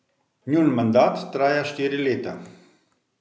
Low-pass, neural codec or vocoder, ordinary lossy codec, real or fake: none; none; none; real